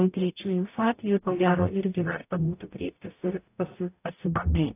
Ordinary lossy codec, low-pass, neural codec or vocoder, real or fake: AAC, 24 kbps; 3.6 kHz; codec, 44.1 kHz, 0.9 kbps, DAC; fake